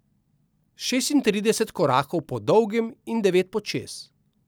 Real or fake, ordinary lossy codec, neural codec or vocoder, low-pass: real; none; none; none